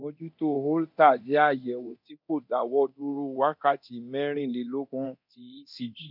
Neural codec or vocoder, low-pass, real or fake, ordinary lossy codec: codec, 24 kHz, 0.9 kbps, DualCodec; 5.4 kHz; fake; none